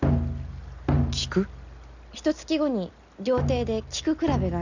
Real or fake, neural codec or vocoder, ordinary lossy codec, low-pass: real; none; none; 7.2 kHz